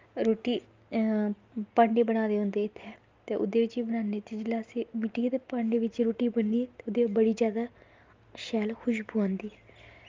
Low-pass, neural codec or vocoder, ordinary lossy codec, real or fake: 7.2 kHz; none; Opus, 32 kbps; real